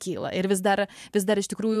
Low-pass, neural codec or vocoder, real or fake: 14.4 kHz; vocoder, 44.1 kHz, 128 mel bands every 512 samples, BigVGAN v2; fake